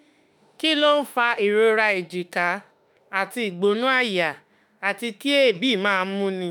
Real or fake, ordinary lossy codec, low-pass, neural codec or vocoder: fake; none; none; autoencoder, 48 kHz, 32 numbers a frame, DAC-VAE, trained on Japanese speech